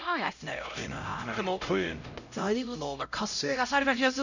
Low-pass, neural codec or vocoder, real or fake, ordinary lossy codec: 7.2 kHz; codec, 16 kHz, 0.5 kbps, X-Codec, HuBERT features, trained on LibriSpeech; fake; none